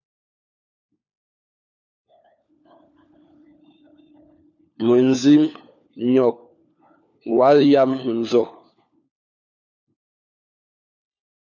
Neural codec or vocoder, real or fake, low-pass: codec, 16 kHz, 4 kbps, FunCodec, trained on LibriTTS, 50 frames a second; fake; 7.2 kHz